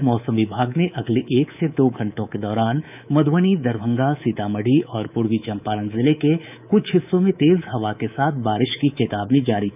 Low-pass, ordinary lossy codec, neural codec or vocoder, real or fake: 3.6 kHz; none; codec, 24 kHz, 3.1 kbps, DualCodec; fake